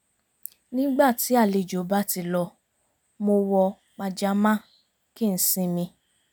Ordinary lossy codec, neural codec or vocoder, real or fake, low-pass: none; none; real; none